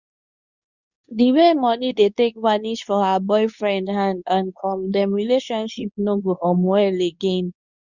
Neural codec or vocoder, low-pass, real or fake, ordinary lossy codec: codec, 24 kHz, 0.9 kbps, WavTokenizer, medium speech release version 2; 7.2 kHz; fake; Opus, 64 kbps